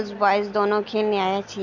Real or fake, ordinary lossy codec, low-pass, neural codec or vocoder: real; none; 7.2 kHz; none